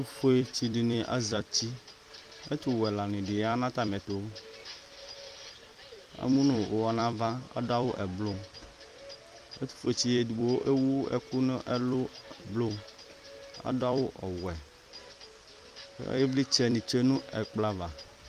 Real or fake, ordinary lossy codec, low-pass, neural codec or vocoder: fake; Opus, 32 kbps; 14.4 kHz; vocoder, 44.1 kHz, 128 mel bands every 256 samples, BigVGAN v2